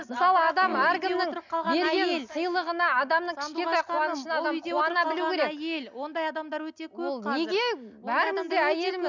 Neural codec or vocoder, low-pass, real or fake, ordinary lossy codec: none; 7.2 kHz; real; none